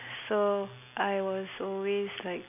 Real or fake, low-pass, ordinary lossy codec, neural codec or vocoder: real; 3.6 kHz; none; none